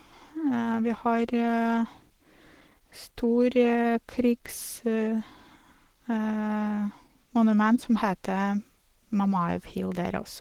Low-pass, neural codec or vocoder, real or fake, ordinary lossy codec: 19.8 kHz; codec, 44.1 kHz, 7.8 kbps, Pupu-Codec; fake; Opus, 16 kbps